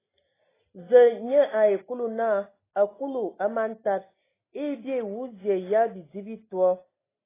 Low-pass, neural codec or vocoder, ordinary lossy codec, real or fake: 3.6 kHz; none; AAC, 16 kbps; real